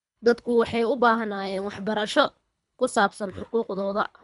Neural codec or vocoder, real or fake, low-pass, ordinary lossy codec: codec, 24 kHz, 3 kbps, HILCodec; fake; 10.8 kHz; none